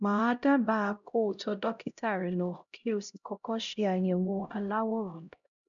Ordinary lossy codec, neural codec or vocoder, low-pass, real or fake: none; codec, 16 kHz, 0.5 kbps, X-Codec, HuBERT features, trained on LibriSpeech; 7.2 kHz; fake